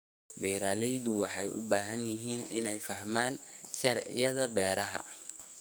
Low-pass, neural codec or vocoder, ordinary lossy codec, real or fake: none; codec, 44.1 kHz, 2.6 kbps, SNAC; none; fake